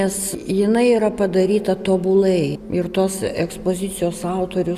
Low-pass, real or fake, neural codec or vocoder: 14.4 kHz; real; none